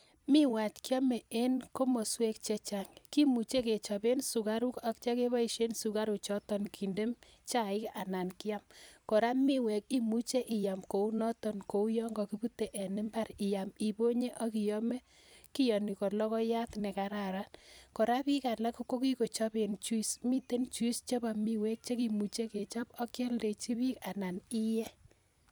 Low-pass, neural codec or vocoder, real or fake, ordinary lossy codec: none; vocoder, 44.1 kHz, 128 mel bands every 256 samples, BigVGAN v2; fake; none